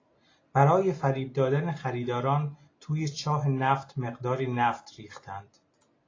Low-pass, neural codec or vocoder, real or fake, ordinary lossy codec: 7.2 kHz; none; real; AAC, 32 kbps